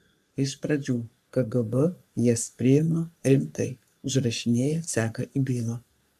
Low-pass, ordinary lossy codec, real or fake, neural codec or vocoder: 14.4 kHz; AAC, 96 kbps; fake; codec, 44.1 kHz, 3.4 kbps, Pupu-Codec